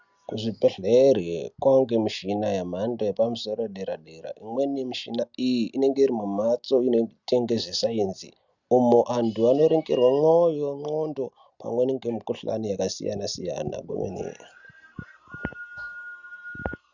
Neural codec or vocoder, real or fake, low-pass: none; real; 7.2 kHz